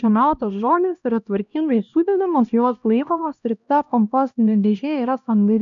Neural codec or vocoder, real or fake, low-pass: codec, 16 kHz, 1 kbps, X-Codec, HuBERT features, trained on LibriSpeech; fake; 7.2 kHz